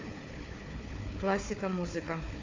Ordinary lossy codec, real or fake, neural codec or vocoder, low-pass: AAC, 32 kbps; fake; codec, 16 kHz, 4 kbps, FunCodec, trained on Chinese and English, 50 frames a second; 7.2 kHz